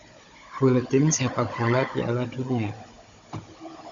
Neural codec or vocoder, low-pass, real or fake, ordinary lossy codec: codec, 16 kHz, 16 kbps, FunCodec, trained on Chinese and English, 50 frames a second; 7.2 kHz; fake; Opus, 64 kbps